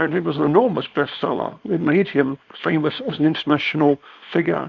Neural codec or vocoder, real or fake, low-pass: codec, 24 kHz, 0.9 kbps, WavTokenizer, small release; fake; 7.2 kHz